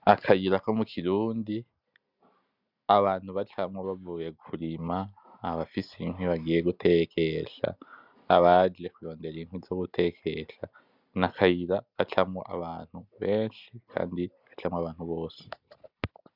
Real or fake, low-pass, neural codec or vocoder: real; 5.4 kHz; none